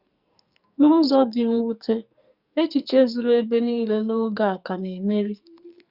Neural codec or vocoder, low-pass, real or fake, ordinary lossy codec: codec, 44.1 kHz, 2.6 kbps, SNAC; 5.4 kHz; fake; Opus, 64 kbps